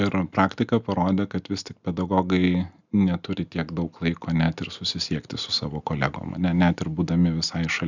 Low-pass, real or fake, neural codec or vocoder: 7.2 kHz; real; none